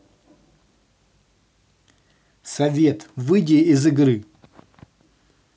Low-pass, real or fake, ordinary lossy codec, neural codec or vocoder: none; real; none; none